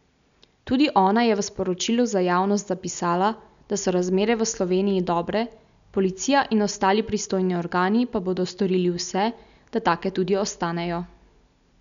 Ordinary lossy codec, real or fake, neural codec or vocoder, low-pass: none; real; none; 7.2 kHz